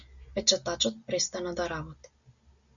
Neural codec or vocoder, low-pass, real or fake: none; 7.2 kHz; real